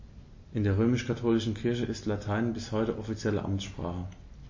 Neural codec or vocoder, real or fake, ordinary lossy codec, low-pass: none; real; MP3, 32 kbps; 7.2 kHz